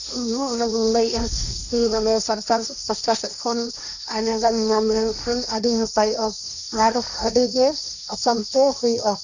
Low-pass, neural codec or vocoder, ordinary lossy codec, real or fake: 7.2 kHz; codec, 16 kHz, 1.1 kbps, Voila-Tokenizer; none; fake